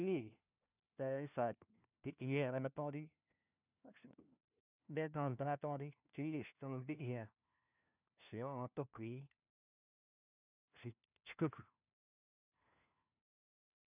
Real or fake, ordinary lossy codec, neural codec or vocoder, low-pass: fake; none; codec, 16 kHz, 1 kbps, FunCodec, trained on LibriTTS, 50 frames a second; 3.6 kHz